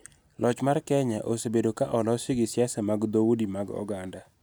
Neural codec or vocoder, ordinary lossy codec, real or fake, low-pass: none; none; real; none